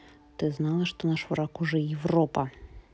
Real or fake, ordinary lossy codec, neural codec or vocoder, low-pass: real; none; none; none